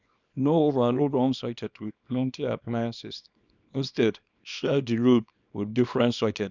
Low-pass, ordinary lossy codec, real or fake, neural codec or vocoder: 7.2 kHz; none; fake; codec, 24 kHz, 0.9 kbps, WavTokenizer, small release